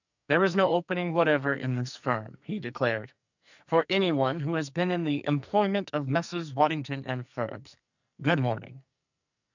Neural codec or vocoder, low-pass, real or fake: codec, 44.1 kHz, 2.6 kbps, SNAC; 7.2 kHz; fake